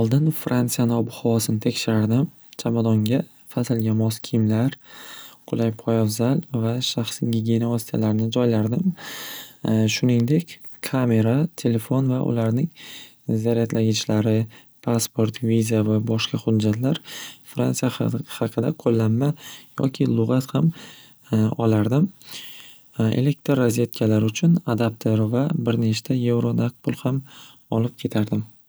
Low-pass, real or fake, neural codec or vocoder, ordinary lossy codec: none; real; none; none